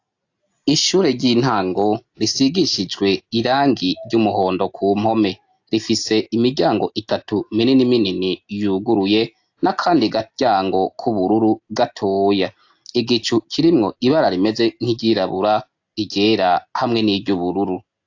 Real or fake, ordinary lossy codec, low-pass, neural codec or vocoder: real; AAC, 48 kbps; 7.2 kHz; none